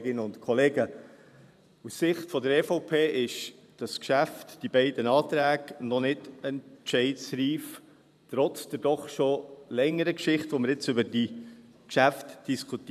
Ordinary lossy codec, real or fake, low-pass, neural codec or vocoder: none; fake; 14.4 kHz; vocoder, 44.1 kHz, 128 mel bands every 512 samples, BigVGAN v2